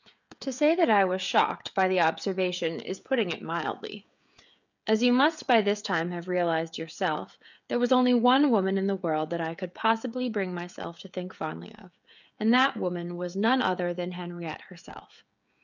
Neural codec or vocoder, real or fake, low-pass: codec, 16 kHz, 16 kbps, FreqCodec, smaller model; fake; 7.2 kHz